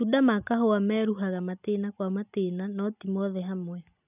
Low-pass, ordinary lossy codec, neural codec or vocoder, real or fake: 3.6 kHz; none; none; real